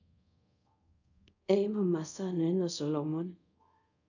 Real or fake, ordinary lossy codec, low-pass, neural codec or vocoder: fake; none; 7.2 kHz; codec, 24 kHz, 0.5 kbps, DualCodec